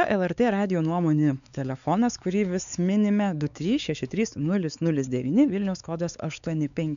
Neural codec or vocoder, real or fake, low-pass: codec, 16 kHz, 4 kbps, X-Codec, WavLM features, trained on Multilingual LibriSpeech; fake; 7.2 kHz